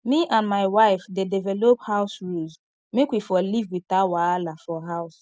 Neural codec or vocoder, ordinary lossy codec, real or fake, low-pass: none; none; real; none